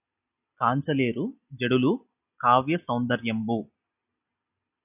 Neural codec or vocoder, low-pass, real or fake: none; 3.6 kHz; real